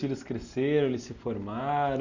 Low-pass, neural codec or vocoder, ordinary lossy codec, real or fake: 7.2 kHz; none; Opus, 64 kbps; real